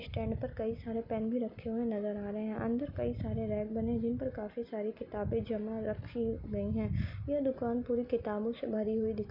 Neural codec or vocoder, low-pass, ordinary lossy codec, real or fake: none; 5.4 kHz; none; real